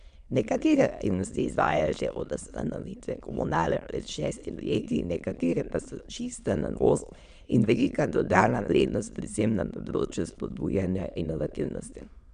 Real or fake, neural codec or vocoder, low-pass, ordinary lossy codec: fake; autoencoder, 22.05 kHz, a latent of 192 numbers a frame, VITS, trained on many speakers; 9.9 kHz; MP3, 96 kbps